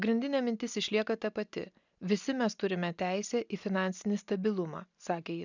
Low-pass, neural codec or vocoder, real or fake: 7.2 kHz; none; real